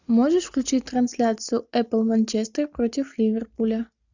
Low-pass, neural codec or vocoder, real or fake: 7.2 kHz; none; real